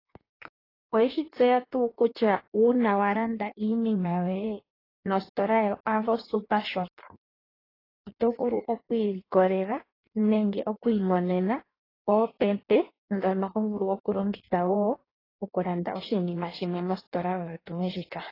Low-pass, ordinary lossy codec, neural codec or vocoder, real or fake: 5.4 kHz; AAC, 24 kbps; codec, 16 kHz in and 24 kHz out, 1.1 kbps, FireRedTTS-2 codec; fake